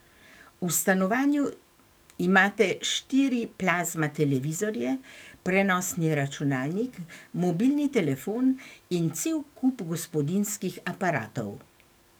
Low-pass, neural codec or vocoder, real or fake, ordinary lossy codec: none; codec, 44.1 kHz, 7.8 kbps, DAC; fake; none